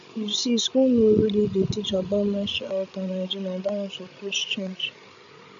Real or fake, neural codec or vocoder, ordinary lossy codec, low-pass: fake; codec, 16 kHz, 16 kbps, FreqCodec, larger model; none; 7.2 kHz